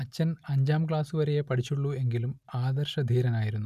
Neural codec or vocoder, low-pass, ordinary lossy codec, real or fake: none; 14.4 kHz; none; real